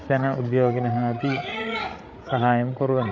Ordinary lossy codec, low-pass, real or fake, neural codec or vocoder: none; none; fake; codec, 16 kHz, 8 kbps, FreqCodec, larger model